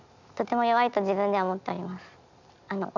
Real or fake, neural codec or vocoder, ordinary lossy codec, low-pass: real; none; none; 7.2 kHz